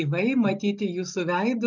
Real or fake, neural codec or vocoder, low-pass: real; none; 7.2 kHz